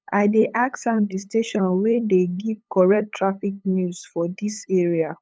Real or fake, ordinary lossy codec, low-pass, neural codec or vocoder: fake; none; none; codec, 16 kHz, 8 kbps, FunCodec, trained on LibriTTS, 25 frames a second